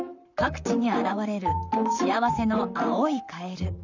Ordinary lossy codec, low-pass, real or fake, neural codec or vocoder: none; 7.2 kHz; fake; vocoder, 44.1 kHz, 128 mel bands, Pupu-Vocoder